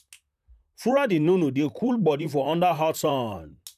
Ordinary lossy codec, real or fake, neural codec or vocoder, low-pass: none; fake; vocoder, 44.1 kHz, 128 mel bands, Pupu-Vocoder; 14.4 kHz